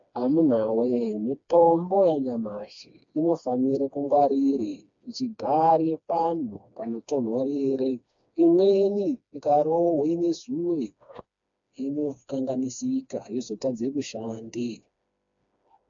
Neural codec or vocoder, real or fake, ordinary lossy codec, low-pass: codec, 16 kHz, 2 kbps, FreqCodec, smaller model; fake; AAC, 64 kbps; 7.2 kHz